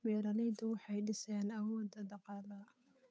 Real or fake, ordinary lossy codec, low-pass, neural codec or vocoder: fake; none; none; codec, 16 kHz, 8 kbps, FunCodec, trained on Chinese and English, 25 frames a second